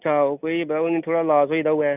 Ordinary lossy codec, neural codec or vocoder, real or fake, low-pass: none; none; real; 3.6 kHz